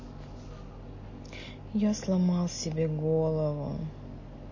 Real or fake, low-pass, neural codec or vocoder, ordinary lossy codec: real; 7.2 kHz; none; MP3, 32 kbps